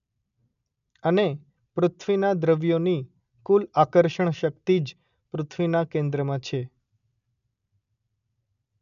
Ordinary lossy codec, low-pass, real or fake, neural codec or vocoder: none; 7.2 kHz; real; none